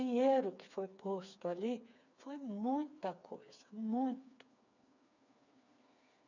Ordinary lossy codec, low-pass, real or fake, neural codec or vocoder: none; 7.2 kHz; fake; codec, 16 kHz, 4 kbps, FreqCodec, smaller model